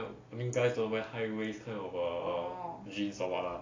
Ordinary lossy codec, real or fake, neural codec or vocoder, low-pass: AAC, 32 kbps; real; none; 7.2 kHz